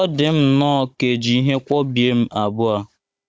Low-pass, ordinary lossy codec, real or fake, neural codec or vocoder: none; none; fake; codec, 16 kHz, 16 kbps, FunCodec, trained on Chinese and English, 50 frames a second